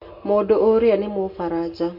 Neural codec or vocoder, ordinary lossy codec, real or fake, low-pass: none; MP3, 48 kbps; real; 5.4 kHz